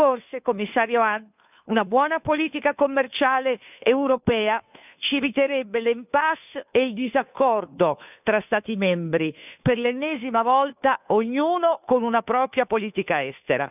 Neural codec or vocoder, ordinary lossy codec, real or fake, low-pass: codec, 16 kHz, 2 kbps, FunCodec, trained on Chinese and English, 25 frames a second; none; fake; 3.6 kHz